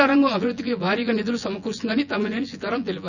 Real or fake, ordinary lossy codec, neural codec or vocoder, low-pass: fake; none; vocoder, 24 kHz, 100 mel bands, Vocos; 7.2 kHz